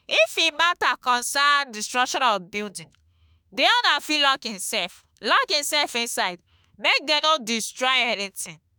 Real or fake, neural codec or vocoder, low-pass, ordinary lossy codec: fake; autoencoder, 48 kHz, 32 numbers a frame, DAC-VAE, trained on Japanese speech; none; none